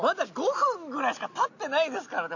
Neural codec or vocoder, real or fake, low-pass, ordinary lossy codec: none; real; 7.2 kHz; none